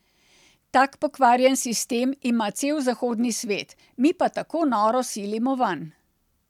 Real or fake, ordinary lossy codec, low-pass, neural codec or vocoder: real; none; 19.8 kHz; none